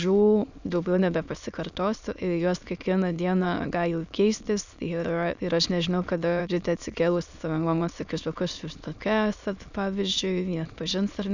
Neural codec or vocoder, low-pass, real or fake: autoencoder, 22.05 kHz, a latent of 192 numbers a frame, VITS, trained on many speakers; 7.2 kHz; fake